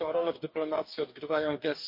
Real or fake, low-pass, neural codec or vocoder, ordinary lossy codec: fake; 5.4 kHz; codec, 44.1 kHz, 2.6 kbps, DAC; MP3, 32 kbps